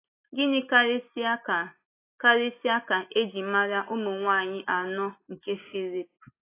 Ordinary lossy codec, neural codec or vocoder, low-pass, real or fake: AAC, 24 kbps; none; 3.6 kHz; real